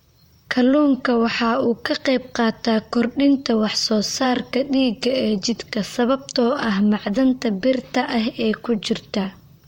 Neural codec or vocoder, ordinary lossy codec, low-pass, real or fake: vocoder, 44.1 kHz, 128 mel bands, Pupu-Vocoder; MP3, 64 kbps; 19.8 kHz; fake